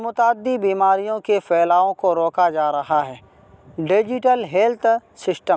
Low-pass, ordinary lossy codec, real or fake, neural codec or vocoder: none; none; real; none